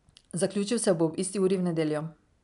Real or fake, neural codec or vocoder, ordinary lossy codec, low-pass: real; none; none; 10.8 kHz